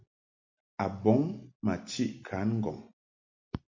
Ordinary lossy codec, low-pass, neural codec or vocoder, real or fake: MP3, 64 kbps; 7.2 kHz; none; real